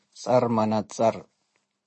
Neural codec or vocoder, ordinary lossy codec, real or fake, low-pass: none; MP3, 32 kbps; real; 10.8 kHz